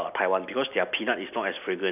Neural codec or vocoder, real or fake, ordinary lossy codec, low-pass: none; real; none; 3.6 kHz